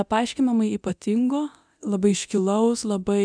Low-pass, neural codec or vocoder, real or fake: 9.9 kHz; codec, 24 kHz, 0.9 kbps, DualCodec; fake